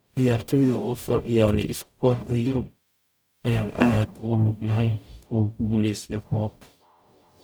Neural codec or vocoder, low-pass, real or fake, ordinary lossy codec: codec, 44.1 kHz, 0.9 kbps, DAC; none; fake; none